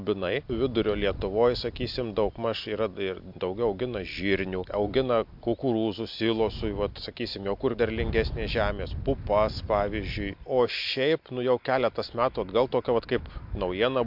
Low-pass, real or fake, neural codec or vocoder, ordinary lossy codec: 5.4 kHz; real; none; MP3, 48 kbps